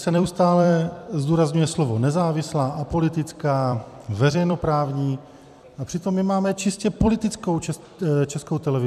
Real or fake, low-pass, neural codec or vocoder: fake; 14.4 kHz; vocoder, 44.1 kHz, 128 mel bands every 256 samples, BigVGAN v2